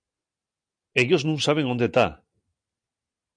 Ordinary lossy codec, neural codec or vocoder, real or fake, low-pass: MP3, 96 kbps; none; real; 9.9 kHz